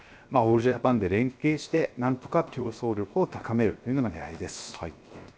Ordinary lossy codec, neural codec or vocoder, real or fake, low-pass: none; codec, 16 kHz, 0.7 kbps, FocalCodec; fake; none